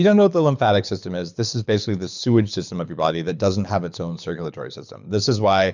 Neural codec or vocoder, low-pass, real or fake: codec, 24 kHz, 6 kbps, HILCodec; 7.2 kHz; fake